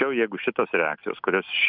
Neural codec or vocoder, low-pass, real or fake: none; 5.4 kHz; real